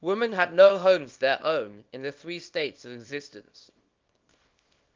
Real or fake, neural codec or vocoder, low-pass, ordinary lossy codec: fake; codec, 24 kHz, 0.9 kbps, WavTokenizer, small release; 7.2 kHz; Opus, 24 kbps